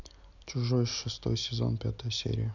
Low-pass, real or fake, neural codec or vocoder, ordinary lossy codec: 7.2 kHz; real; none; none